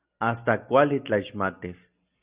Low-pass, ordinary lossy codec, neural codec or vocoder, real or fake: 3.6 kHz; Opus, 64 kbps; none; real